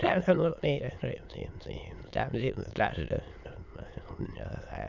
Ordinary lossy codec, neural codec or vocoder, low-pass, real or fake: none; autoencoder, 22.05 kHz, a latent of 192 numbers a frame, VITS, trained on many speakers; 7.2 kHz; fake